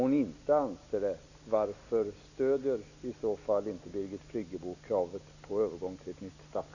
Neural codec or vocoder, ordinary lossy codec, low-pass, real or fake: none; none; 7.2 kHz; real